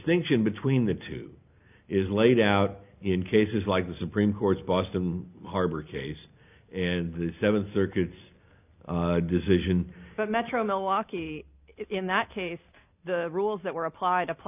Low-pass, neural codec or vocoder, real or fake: 3.6 kHz; none; real